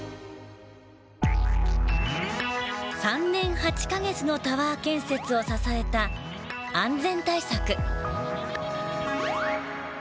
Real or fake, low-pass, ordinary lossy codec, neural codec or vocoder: real; none; none; none